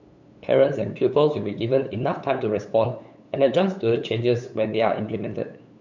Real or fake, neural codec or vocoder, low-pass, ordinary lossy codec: fake; codec, 16 kHz, 8 kbps, FunCodec, trained on LibriTTS, 25 frames a second; 7.2 kHz; none